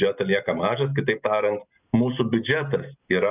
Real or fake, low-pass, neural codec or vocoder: real; 3.6 kHz; none